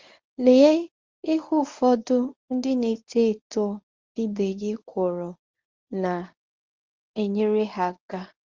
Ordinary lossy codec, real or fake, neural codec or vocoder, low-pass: Opus, 32 kbps; fake; codec, 24 kHz, 0.9 kbps, WavTokenizer, medium speech release version 1; 7.2 kHz